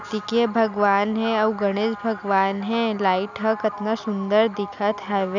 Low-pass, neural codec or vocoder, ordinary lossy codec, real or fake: 7.2 kHz; none; none; real